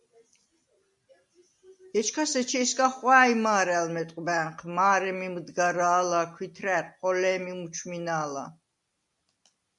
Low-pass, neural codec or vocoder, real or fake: 10.8 kHz; none; real